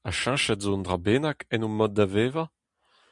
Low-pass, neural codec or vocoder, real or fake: 10.8 kHz; none; real